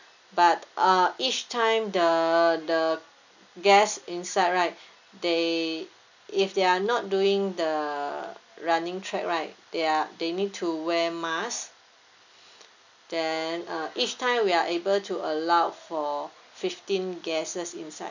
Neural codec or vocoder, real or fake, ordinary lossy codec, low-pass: none; real; none; 7.2 kHz